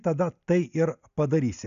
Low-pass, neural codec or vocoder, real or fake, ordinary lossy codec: 7.2 kHz; none; real; AAC, 96 kbps